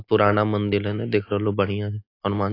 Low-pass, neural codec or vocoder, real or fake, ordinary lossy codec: 5.4 kHz; none; real; AAC, 32 kbps